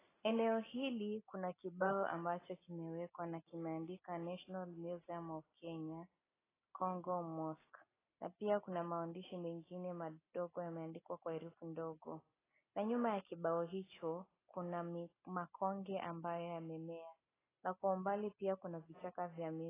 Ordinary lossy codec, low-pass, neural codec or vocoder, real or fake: AAC, 16 kbps; 3.6 kHz; none; real